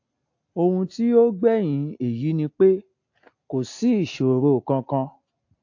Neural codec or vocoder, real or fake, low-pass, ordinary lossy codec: none; real; 7.2 kHz; AAC, 48 kbps